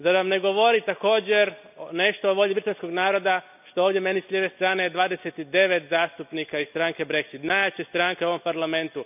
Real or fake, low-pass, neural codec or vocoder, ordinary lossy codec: real; 3.6 kHz; none; none